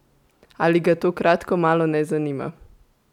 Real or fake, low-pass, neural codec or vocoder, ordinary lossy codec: real; 19.8 kHz; none; none